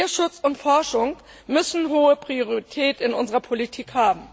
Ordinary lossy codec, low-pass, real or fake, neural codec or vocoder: none; none; real; none